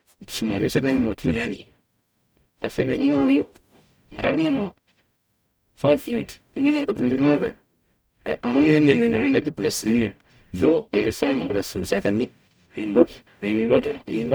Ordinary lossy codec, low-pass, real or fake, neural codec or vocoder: none; none; fake; codec, 44.1 kHz, 0.9 kbps, DAC